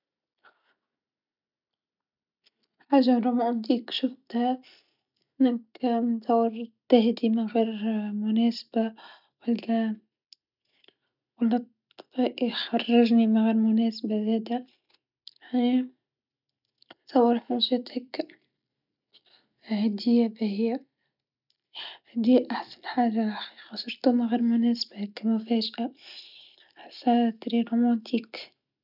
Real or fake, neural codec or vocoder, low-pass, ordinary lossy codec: real; none; 5.4 kHz; none